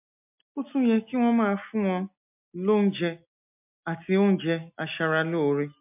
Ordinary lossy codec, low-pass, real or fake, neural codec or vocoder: MP3, 32 kbps; 3.6 kHz; real; none